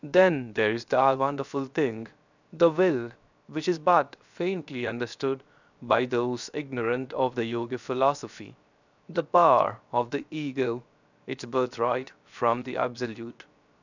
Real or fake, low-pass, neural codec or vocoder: fake; 7.2 kHz; codec, 16 kHz, 0.7 kbps, FocalCodec